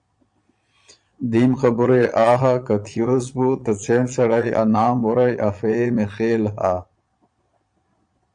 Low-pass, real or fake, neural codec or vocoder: 9.9 kHz; fake; vocoder, 22.05 kHz, 80 mel bands, Vocos